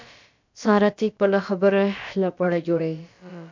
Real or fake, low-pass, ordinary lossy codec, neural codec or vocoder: fake; 7.2 kHz; MP3, 64 kbps; codec, 16 kHz, about 1 kbps, DyCAST, with the encoder's durations